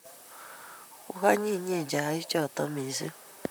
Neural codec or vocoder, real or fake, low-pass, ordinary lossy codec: vocoder, 44.1 kHz, 128 mel bands, Pupu-Vocoder; fake; none; none